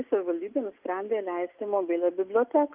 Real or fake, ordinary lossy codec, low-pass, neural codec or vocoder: real; Opus, 32 kbps; 3.6 kHz; none